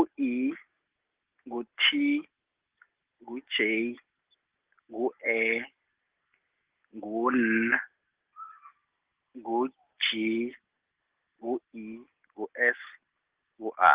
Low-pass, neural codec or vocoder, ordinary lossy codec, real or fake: 3.6 kHz; none; Opus, 16 kbps; real